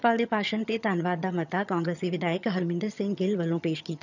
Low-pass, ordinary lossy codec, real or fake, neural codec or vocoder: 7.2 kHz; none; fake; vocoder, 22.05 kHz, 80 mel bands, HiFi-GAN